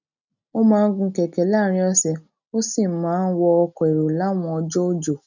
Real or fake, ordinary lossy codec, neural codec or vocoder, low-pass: real; none; none; 7.2 kHz